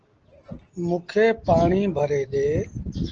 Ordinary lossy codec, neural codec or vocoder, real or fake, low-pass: Opus, 16 kbps; none; real; 7.2 kHz